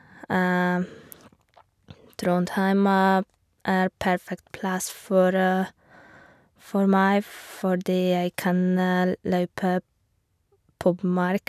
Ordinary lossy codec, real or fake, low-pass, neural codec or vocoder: none; real; 14.4 kHz; none